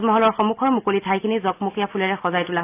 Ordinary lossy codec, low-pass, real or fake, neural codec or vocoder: AAC, 24 kbps; 3.6 kHz; real; none